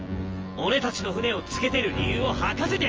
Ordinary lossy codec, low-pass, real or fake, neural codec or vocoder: Opus, 24 kbps; 7.2 kHz; fake; vocoder, 24 kHz, 100 mel bands, Vocos